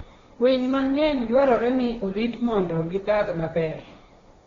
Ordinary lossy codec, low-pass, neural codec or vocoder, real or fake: AAC, 32 kbps; 7.2 kHz; codec, 16 kHz, 1.1 kbps, Voila-Tokenizer; fake